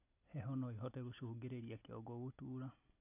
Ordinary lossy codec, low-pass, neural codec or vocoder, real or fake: AAC, 24 kbps; 3.6 kHz; none; real